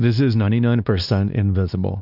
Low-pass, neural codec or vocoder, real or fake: 5.4 kHz; codec, 16 kHz, 2 kbps, X-Codec, WavLM features, trained on Multilingual LibriSpeech; fake